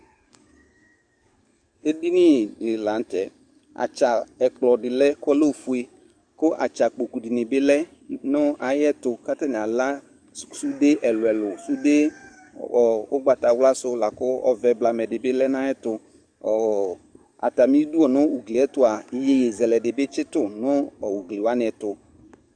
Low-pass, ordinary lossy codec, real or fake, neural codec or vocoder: 9.9 kHz; Opus, 64 kbps; fake; codec, 44.1 kHz, 7.8 kbps, DAC